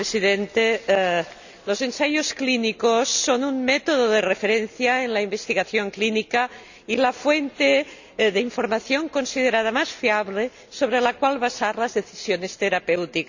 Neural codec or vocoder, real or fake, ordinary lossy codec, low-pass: none; real; none; 7.2 kHz